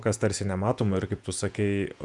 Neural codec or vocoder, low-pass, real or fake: vocoder, 48 kHz, 128 mel bands, Vocos; 10.8 kHz; fake